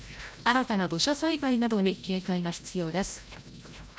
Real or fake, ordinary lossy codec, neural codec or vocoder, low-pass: fake; none; codec, 16 kHz, 0.5 kbps, FreqCodec, larger model; none